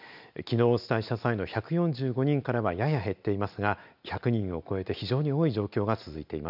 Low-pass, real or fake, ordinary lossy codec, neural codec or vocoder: 5.4 kHz; real; none; none